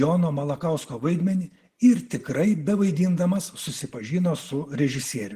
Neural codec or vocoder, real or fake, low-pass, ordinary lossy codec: none; real; 14.4 kHz; Opus, 16 kbps